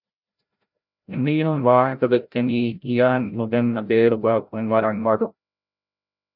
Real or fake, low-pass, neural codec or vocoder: fake; 5.4 kHz; codec, 16 kHz, 0.5 kbps, FreqCodec, larger model